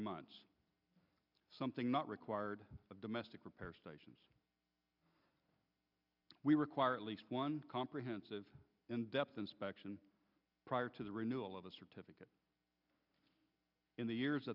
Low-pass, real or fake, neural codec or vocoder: 5.4 kHz; real; none